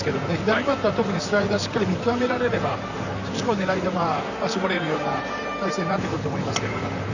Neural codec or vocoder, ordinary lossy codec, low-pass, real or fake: vocoder, 44.1 kHz, 128 mel bands, Pupu-Vocoder; none; 7.2 kHz; fake